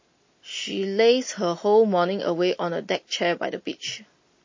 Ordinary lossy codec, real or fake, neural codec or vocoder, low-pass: MP3, 32 kbps; real; none; 7.2 kHz